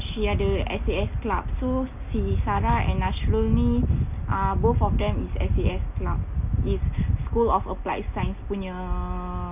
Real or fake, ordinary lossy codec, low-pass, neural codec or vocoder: real; none; 3.6 kHz; none